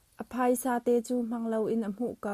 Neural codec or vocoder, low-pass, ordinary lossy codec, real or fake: none; 14.4 kHz; Opus, 64 kbps; real